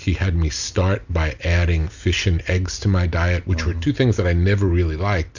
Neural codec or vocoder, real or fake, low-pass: none; real; 7.2 kHz